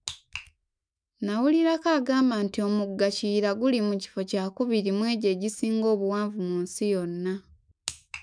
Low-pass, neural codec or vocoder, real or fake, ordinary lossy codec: 9.9 kHz; autoencoder, 48 kHz, 128 numbers a frame, DAC-VAE, trained on Japanese speech; fake; none